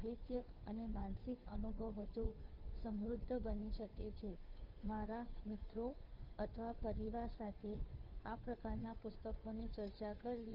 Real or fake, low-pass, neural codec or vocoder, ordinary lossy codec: fake; 5.4 kHz; codec, 16 kHz, 2 kbps, FunCodec, trained on Chinese and English, 25 frames a second; Opus, 32 kbps